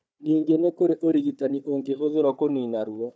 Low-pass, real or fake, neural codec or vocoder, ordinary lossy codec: none; fake; codec, 16 kHz, 4 kbps, FunCodec, trained on Chinese and English, 50 frames a second; none